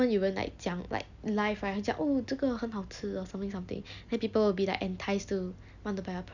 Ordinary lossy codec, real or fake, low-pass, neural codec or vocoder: none; real; 7.2 kHz; none